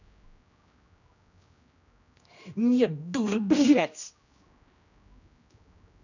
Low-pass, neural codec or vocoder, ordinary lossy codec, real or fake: 7.2 kHz; codec, 16 kHz, 1 kbps, X-Codec, HuBERT features, trained on general audio; none; fake